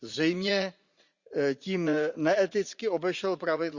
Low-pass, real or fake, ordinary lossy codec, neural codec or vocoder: 7.2 kHz; fake; Opus, 64 kbps; vocoder, 44.1 kHz, 80 mel bands, Vocos